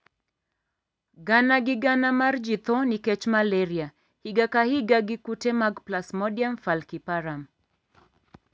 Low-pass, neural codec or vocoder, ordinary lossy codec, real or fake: none; none; none; real